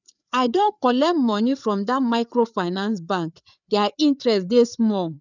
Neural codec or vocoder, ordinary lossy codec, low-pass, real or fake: codec, 16 kHz, 4 kbps, FreqCodec, larger model; none; 7.2 kHz; fake